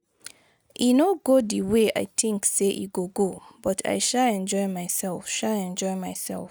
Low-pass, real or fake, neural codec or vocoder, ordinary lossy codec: none; real; none; none